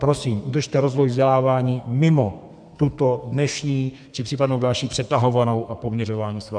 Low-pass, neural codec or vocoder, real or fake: 9.9 kHz; codec, 32 kHz, 1.9 kbps, SNAC; fake